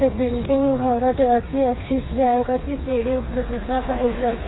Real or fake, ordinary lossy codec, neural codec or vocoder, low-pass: fake; AAC, 16 kbps; codec, 16 kHz, 4 kbps, FreqCodec, smaller model; 7.2 kHz